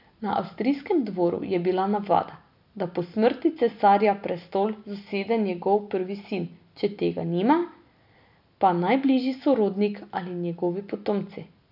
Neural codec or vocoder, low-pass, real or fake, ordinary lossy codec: none; 5.4 kHz; real; none